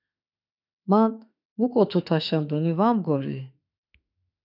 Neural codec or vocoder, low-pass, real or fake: autoencoder, 48 kHz, 32 numbers a frame, DAC-VAE, trained on Japanese speech; 5.4 kHz; fake